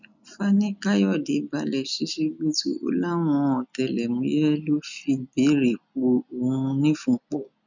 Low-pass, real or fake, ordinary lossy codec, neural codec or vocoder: 7.2 kHz; real; none; none